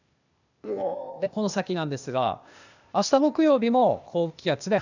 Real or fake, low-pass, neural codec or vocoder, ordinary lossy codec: fake; 7.2 kHz; codec, 16 kHz, 0.8 kbps, ZipCodec; none